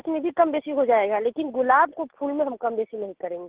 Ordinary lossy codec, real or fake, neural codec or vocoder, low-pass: Opus, 16 kbps; real; none; 3.6 kHz